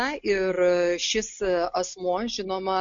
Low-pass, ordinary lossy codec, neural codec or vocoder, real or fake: 7.2 kHz; MP3, 48 kbps; none; real